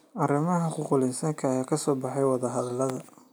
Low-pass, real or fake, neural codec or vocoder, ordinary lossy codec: none; real; none; none